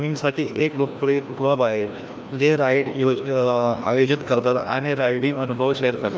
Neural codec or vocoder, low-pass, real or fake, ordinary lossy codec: codec, 16 kHz, 1 kbps, FreqCodec, larger model; none; fake; none